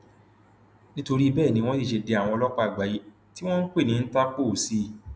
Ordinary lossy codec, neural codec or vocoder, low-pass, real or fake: none; none; none; real